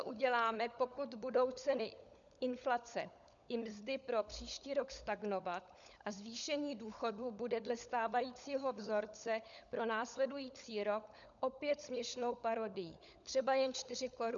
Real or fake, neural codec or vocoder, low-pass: fake; codec, 16 kHz, 16 kbps, FunCodec, trained on LibriTTS, 50 frames a second; 7.2 kHz